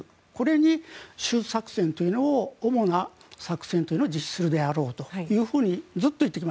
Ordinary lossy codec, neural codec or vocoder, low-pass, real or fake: none; none; none; real